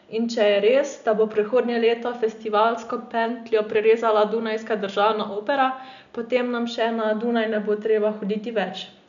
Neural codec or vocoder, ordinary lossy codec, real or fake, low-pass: none; none; real; 7.2 kHz